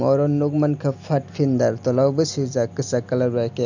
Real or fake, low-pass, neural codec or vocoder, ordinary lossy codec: real; 7.2 kHz; none; none